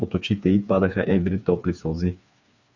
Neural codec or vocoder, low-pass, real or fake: codec, 16 kHz, 4 kbps, FreqCodec, smaller model; 7.2 kHz; fake